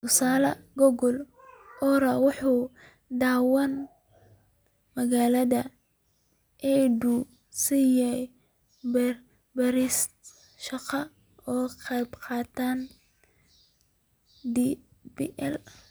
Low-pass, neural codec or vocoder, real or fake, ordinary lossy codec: none; none; real; none